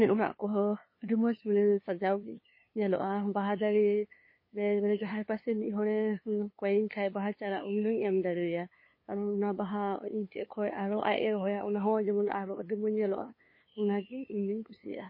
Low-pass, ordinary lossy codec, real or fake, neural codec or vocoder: 5.4 kHz; MP3, 24 kbps; fake; codec, 16 kHz, 2 kbps, FunCodec, trained on LibriTTS, 25 frames a second